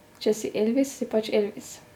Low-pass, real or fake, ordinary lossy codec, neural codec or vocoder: 19.8 kHz; real; none; none